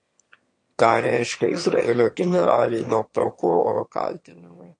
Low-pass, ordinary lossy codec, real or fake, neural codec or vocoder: 9.9 kHz; MP3, 48 kbps; fake; autoencoder, 22.05 kHz, a latent of 192 numbers a frame, VITS, trained on one speaker